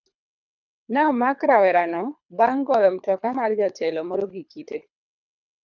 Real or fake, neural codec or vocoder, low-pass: fake; codec, 24 kHz, 3 kbps, HILCodec; 7.2 kHz